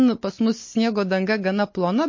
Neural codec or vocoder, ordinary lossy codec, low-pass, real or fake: none; MP3, 32 kbps; 7.2 kHz; real